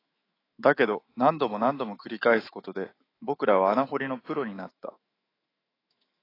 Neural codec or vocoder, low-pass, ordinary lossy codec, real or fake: autoencoder, 48 kHz, 128 numbers a frame, DAC-VAE, trained on Japanese speech; 5.4 kHz; AAC, 24 kbps; fake